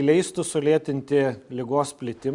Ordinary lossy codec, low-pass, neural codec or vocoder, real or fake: Opus, 64 kbps; 10.8 kHz; none; real